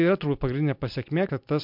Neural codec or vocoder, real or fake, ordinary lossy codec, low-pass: none; real; MP3, 48 kbps; 5.4 kHz